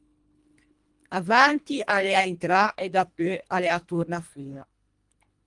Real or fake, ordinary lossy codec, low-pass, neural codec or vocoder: fake; Opus, 32 kbps; 10.8 kHz; codec, 24 kHz, 1.5 kbps, HILCodec